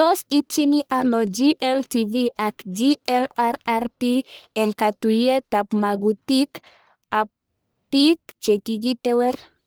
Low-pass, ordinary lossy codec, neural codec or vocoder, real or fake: none; none; codec, 44.1 kHz, 1.7 kbps, Pupu-Codec; fake